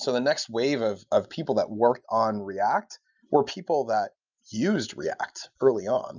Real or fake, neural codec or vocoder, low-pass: real; none; 7.2 kHz